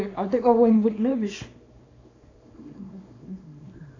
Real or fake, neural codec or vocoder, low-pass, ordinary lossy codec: fake; codec, 24 kHz, 0.9 kbps, WavTokenizer, small release; 7.2 kHz; AAC, 32 kbps